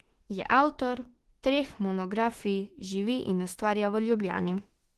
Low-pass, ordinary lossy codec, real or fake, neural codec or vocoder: 14.4 kHz; Opus, 16 kbps; fake; autoencoder, 48 kHz, 32 numbers a frame, DAC-VAE, trained on Japanese speech